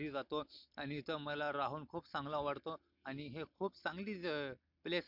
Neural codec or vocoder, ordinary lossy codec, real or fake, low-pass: none; MP3, 48 kbps; real; 5.4 kHz